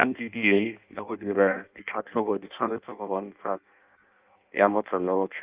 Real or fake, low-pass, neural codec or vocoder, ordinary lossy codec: fake; 3.6 kHz; codec, 16 kHz in and 24 kHz out, 0.6 kbps, FireRedTTS-2 codec; Opus, 24 kbps